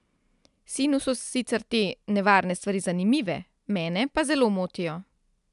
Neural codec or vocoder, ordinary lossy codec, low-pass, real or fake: none; none; 10.8 kHz; real